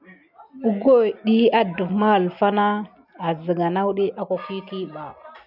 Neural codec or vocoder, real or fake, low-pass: none; real; 5.4 kHz